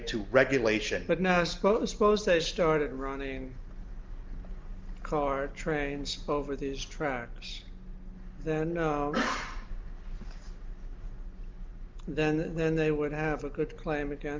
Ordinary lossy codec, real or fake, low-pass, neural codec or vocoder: Opus, 32 kbps; real; 7.2 kHz; none